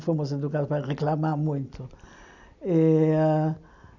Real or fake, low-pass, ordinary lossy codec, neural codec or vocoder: real; 7.2 kHz; none; none